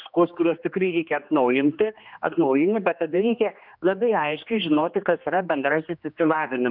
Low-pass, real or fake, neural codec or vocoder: 5.4 kHz; fake; codec, 16 kHz, 2 kbps, X-Codec, HuBERT features, trained on general audio